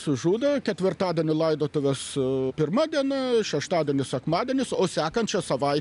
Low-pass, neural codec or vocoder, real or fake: 10.8 kHz; none; real